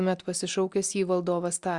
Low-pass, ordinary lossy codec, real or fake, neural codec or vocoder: 10.8 kHz; Opus, 64 kbps; real; none